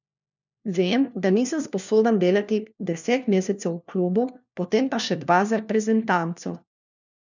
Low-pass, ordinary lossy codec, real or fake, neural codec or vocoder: 7.2 kHz; none; fake; codec, 16 kHz, 1 kbps, FunCodec, trained on LibriTTS, 50 frames a second